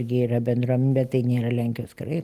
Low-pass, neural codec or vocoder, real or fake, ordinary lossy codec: 14.4 kHz; none; real; Opus, 32 kbps